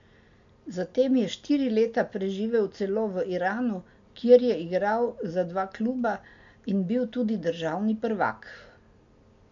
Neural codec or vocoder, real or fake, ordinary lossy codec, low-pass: none; real; MP3, 64 kbps; 7.2 kHz